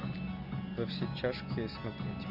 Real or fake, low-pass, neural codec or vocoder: real; 5.4 kHz; none